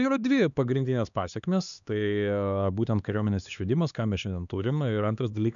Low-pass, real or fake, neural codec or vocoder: 7.2 kHz; fake; codec, 16 kHz, 2 kbps, X-Codec, HuBERT features, trained on LibriSpeech